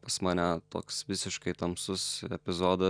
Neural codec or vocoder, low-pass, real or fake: none; 9.9 kHz; real